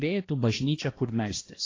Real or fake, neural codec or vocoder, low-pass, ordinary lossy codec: fake; codec, 16 kHz, 1 kbps, X-Codec, HuBERT features, trained on balanced general audio; 7.2 kHz; AAC, 32 kbps